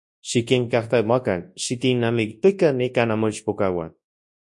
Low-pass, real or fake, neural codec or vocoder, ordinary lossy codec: 10.8 kHz; fake; codec, 24 kHz, 0.9 kbps, WavTokenizer, large speech release; MP3, 48 kbps